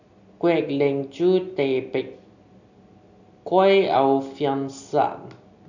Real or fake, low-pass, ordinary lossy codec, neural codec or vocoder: real; 7.2 kHz; none; none